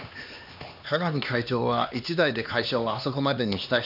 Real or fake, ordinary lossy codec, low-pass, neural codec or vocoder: fake; none; 5.4 kHz; codec, 16 kHz, 2 kbps, X-Codec, HuBERT features, trained on LibriSpeech